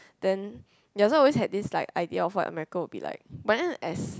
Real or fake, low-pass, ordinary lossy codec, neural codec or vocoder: real; none; none; none